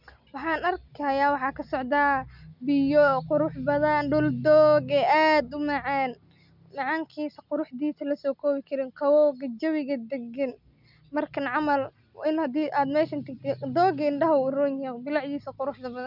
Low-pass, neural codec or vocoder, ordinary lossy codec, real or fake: 5.4 kHz; none; none; real